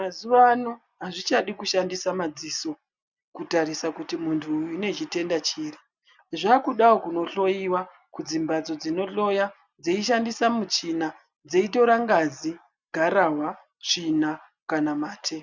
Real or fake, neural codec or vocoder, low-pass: real; none; 7.2 kHz